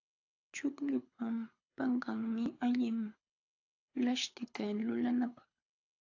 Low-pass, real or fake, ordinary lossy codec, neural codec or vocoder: 7.2 kHz; fake; AAC, 48 kbps; codec, 24 kHz, 6 kbps, HILCodec